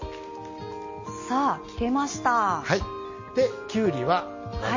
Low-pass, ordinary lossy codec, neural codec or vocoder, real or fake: 7.2 kHz; MP3, 32 kbps; none; real